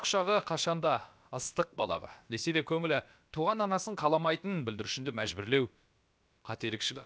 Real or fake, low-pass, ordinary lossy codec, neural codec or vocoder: fake; none; none; codec, 16 kHz, about 1 kbps, DyCAST, with the encoder's durations